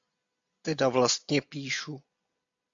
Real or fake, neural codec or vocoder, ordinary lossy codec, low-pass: real; none; AAC, 64 kbps; 7.2 kHz